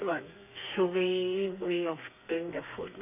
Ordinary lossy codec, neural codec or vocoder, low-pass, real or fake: none; codec, 32 kHz, 1.9 kbps, SNAC; 3.6 kHz; fake